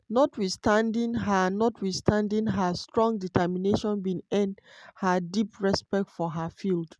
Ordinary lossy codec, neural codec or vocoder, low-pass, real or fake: none; none; none; real